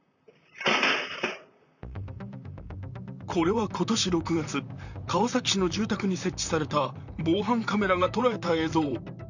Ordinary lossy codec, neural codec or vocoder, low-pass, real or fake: none; vocoder, 44.1 kHz, 128 mel bands, Pupu-Vocoder; 7.2 kHz; fake